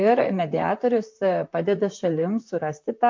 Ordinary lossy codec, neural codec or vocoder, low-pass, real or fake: MP3, 48 kbps; vocoder, 44.1 kHz, 128 mel bands, Pupu-Vocoder; 7.2 kHz; fake